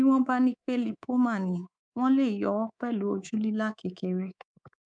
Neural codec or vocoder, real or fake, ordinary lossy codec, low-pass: codec, 24 kHz, 3.1 kbps, DualCodec; fake; none; 9.9 kHz